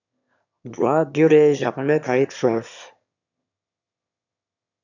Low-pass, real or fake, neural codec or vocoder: 7.2 kHz; fake; autoencoder, 22.05 kHz, a latent of 192 numbers a frame, VITS, trained on one speaker